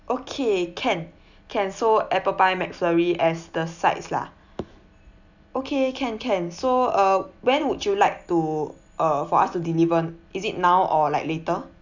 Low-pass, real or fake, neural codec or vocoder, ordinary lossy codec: 7.2 kHz; real; none; none